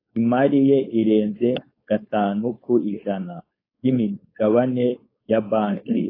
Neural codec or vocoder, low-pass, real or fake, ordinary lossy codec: codec, 16 kHz, 4.8 kbps, FACodec; 5.4 kHz; fake; AAC, 24 kbps